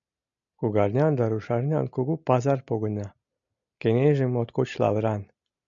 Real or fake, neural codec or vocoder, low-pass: real; none; 7.2 kHz